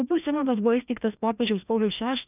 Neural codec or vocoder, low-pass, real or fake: codec, 16 kHz, 1 kbps, FreqCodec, larger model; 3.6 kHz; fake